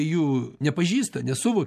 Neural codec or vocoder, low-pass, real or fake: none; 14.4 kHz; real